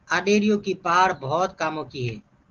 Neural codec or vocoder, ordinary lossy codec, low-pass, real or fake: none; Opus, 16 kbps; 7.2 kHz; real